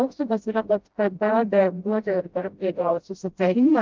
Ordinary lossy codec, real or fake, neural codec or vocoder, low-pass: Opus, 32 kbps; fake; codec, 16 kHz, 0.5 kbps, FreqCodec, smaller model; 7.2 kHz